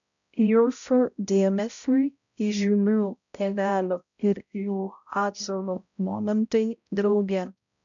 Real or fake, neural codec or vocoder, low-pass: fake; codec, 16 kHz, 0.5 kbps, X-Codec, HuBERT features, trained on balanced general audio; 7.2 kHz